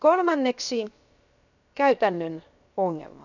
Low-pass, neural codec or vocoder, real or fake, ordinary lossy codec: 7.2 kHz; codec, 16 kHz, 0.7 kbps, FocalCodec; fake; none